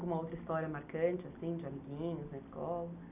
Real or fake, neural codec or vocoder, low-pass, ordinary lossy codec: real; none; 3.6 kHz; none